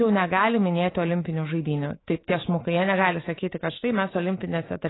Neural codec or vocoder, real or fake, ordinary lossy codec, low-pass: autoencoder, 48 kHz, 128 numbers a frame, DAC-VAE, trained on Japanese speech; fake; AAC, 16 kbps; 7.2 kHz